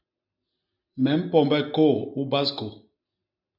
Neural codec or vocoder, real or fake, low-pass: none; real; 5.4 kHz